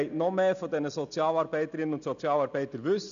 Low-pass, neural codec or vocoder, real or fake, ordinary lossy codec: 7.2 kHz; none; real; none